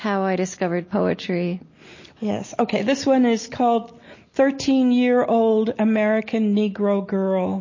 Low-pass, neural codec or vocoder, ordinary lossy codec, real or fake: 7.2 kHz; none; MP3, 32 kbps; real